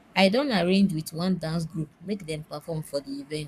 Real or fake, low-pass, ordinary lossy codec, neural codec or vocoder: fake; 14.4 kHz; none; codec, 44.1 kHz, 7.8 kbps, Pupu-Codec